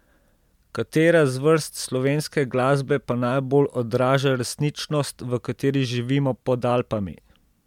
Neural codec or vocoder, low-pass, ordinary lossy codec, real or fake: none; 19.8 kHz; MP3, 96 kbps; real